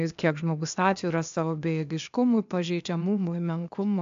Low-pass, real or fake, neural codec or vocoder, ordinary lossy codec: 7.2 kHz; fake; codec, 16 kHz, 0.8 kbps, ZipCodec; AAC, 64 kbps